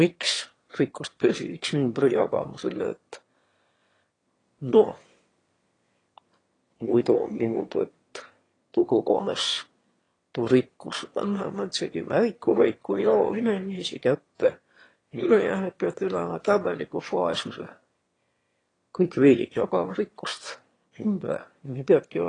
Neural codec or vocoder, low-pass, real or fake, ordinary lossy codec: autoencoder, 22.05 kHz, a latent of 192 numbers a frame, VITS, trained on one speaker; 9.9 kHz; fake; AAC, 32 kbps